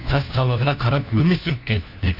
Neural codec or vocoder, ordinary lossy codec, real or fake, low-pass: codec, 16 kHz, 1 kbps, FunCodec, trained on LibriTTS, 50 frames a second; none; fake; 5.4 kHz